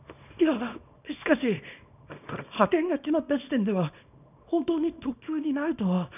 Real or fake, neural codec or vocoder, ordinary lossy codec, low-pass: fake; codec, 24 kHz, 0.9 kbps, WavTokenizer, small release; none; 3.6 kHz